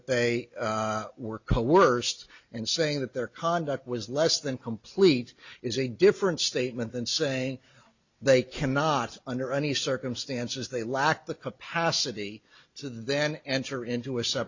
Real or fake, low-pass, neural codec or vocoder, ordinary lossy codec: real; 7.2 kHz; none; Opus, 64 kbps